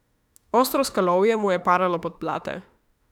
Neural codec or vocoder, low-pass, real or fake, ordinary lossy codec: autoencoder, 48 kHz, 32 numbers a frame, DAC-VAE, trained on Japanese speech; 19.8 kHz; fake; none